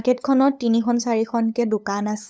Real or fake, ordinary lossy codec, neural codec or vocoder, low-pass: fake; none; codec, 16 kHz, 8 kbps, FunCodec, trained on LibriTTS, 25 frames a second; none